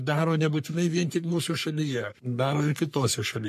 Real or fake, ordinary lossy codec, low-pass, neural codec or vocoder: fake; MP3, 64 kbps; 14.4 kHz; codec, 44.1 kHz, 3.4 kbps, Pupu-Codec